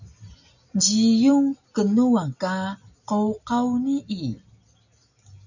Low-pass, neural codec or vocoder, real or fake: 7.2 kHz; none; real